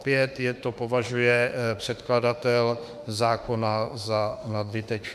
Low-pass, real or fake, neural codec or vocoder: 14.4 kHz; fake; autoencoder, 48 kHz, 32 numbers a frame, DAC-VAE, trained on Japanese speech